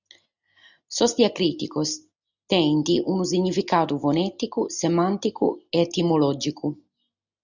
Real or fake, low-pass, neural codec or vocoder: real; 7.2 kHz; none